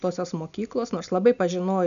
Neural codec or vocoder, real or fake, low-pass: none; real; 7.2 kHz